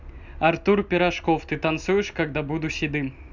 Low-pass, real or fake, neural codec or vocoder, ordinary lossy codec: 7.2 kHz; real; none; none